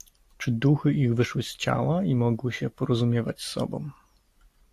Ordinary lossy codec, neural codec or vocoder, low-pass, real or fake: AAC, 64 kbps; none; 14.4 kHz; real